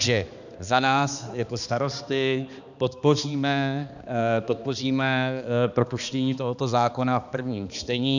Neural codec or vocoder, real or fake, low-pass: codec, 16 kHz, 2 kbps, X-Codec, HuBERT features, trained on balanced general audio; fake; 7.2 kHz